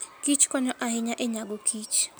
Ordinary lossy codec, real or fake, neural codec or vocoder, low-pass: none; real; none; none